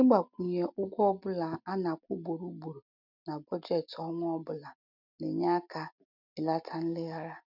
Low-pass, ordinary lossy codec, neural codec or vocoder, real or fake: 5.4 kHz; none; none; real